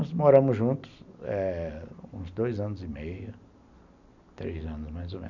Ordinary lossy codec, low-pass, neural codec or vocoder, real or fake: none; 7.2 kHz; none; real